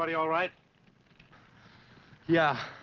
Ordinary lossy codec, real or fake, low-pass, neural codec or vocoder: Opus, 16 kbps; real; 7.2 kHz; none